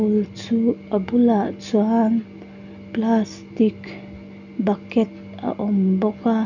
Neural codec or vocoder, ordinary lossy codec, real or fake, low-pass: none; none; real; 7.2 kHz